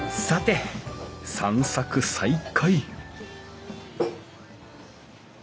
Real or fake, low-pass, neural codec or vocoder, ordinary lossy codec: real; none; none; none